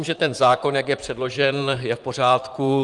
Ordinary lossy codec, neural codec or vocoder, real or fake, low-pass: Opus, 32 kbps; vocoder, 44.1 kHz, 128 mel bands, Pupu-Vocoder; fake; 10.8 kHz